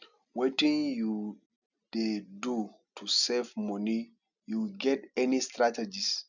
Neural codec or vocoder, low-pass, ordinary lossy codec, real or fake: none; 7.2 kHz; none; real